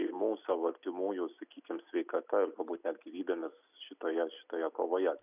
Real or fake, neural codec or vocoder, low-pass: real; none; 3.6 kHz